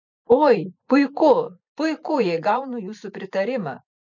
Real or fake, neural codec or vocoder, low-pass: fake; vocoder, 44.1 kHz, 128 mel bands every 512 samples, BigVGAN v2; 7.2 kHz